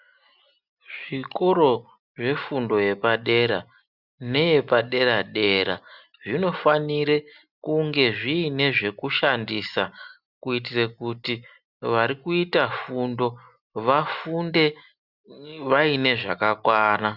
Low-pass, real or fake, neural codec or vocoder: 5.4 kHz; real; none